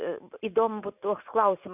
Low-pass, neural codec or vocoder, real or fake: 3.6 kHz; none; real